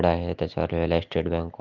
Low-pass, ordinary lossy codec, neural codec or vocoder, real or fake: 7.2 kHz; Opus, 32 kbps; none; real